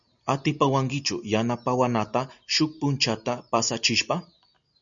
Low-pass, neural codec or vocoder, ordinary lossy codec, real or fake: 7.2 kHz; none; MP3, 96 kbps; real